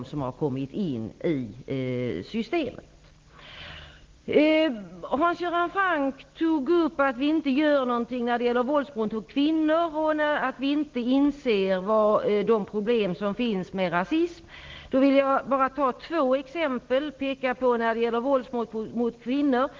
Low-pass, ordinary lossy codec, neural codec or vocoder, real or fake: 7.2 kHz; Opus, 16 kbps; none; real